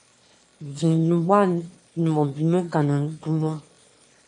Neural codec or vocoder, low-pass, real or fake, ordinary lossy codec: autoencoder, 22.05 kHz, a latent of 192 numbers a frame, VITS, trained on one speaker; 9.9 kHz; fake; MP3, 64 kbps